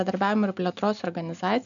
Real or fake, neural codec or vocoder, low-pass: real; none; 7.2 kHz